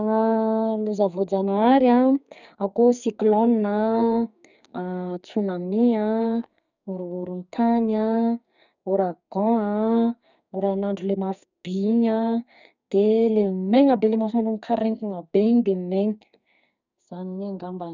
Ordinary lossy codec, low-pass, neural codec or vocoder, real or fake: none; 7.2 kHz; codec, 44.1 kHz, 2.6 kbps, SNAC; fake